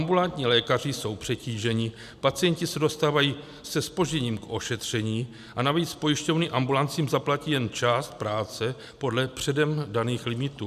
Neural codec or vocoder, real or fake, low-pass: none; real; 14.4 kHz